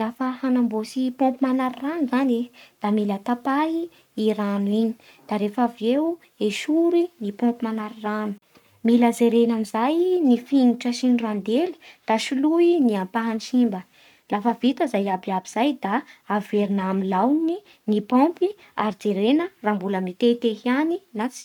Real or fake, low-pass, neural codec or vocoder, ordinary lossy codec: fake; 19.8 kHz; codec, 44.1 kHz, 7.8 kbps, Pupu-Codec; none